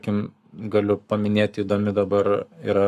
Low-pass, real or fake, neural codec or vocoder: 14.4 kHz; fake; codec, 44.1 kHz, 7.8 kbps, Pupu-Codec